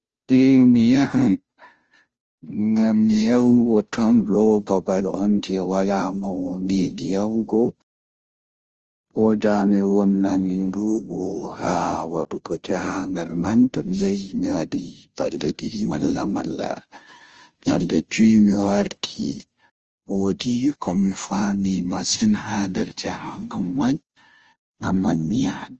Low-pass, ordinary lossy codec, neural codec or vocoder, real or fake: 7.2 kHz; Opus, 24 kbps; codec, 16 kHz, 0.5 kbps, FunCodec, trained on Chinese and English, 25 frames a second; fake